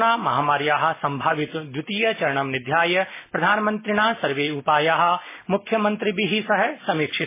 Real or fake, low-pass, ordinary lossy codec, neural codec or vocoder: real; 3.6 kHz; MP3, 16 kbps; none